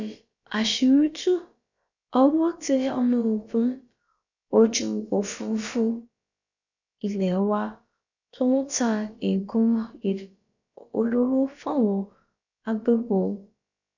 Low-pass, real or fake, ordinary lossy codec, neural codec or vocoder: 7.2 kHz; fake; none; codec, 16 kHz, about 1 kbps, DyCAST, with the encoder's durations